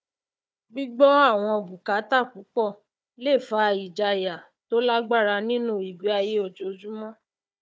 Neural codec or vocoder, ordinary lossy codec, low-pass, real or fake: codec, 16 kHz, 4 kbps, FunCodec, trained on Chinese and English, 50 frames a second; none; none; fake